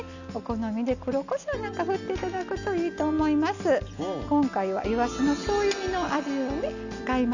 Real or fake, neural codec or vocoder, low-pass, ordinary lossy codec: real; none; 7.2 kHz; none